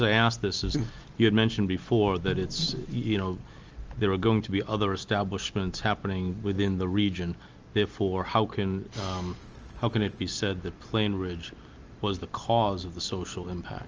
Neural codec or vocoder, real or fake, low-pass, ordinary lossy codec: none; real; 7.2 kHz; Opus, 24 kbps